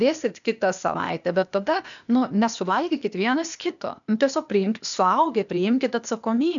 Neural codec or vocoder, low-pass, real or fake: codec, 16 kHz, 0.8 kbps, ZipCodec; 7.2 kHz; fake